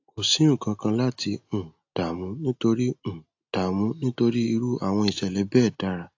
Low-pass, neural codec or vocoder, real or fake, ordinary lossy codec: 7.2 kHz; none; real; AAC, 48 kbps